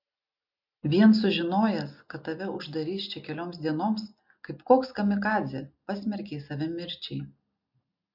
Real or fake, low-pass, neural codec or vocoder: real; 5.4 kHz; none